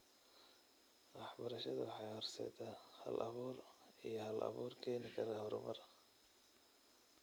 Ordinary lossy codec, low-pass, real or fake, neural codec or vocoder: none; none; real; none